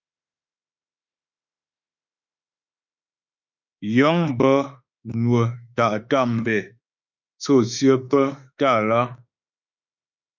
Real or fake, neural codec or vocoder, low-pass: fake; autoencoder, 48 kHz, 32 numbers a frame, DAC-VAE, trained on Japanese speech; 7.2 kHz